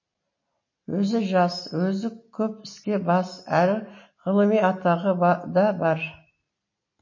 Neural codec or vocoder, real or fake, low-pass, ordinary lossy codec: none; real; 7.2 kHz; MP3, 32 kbps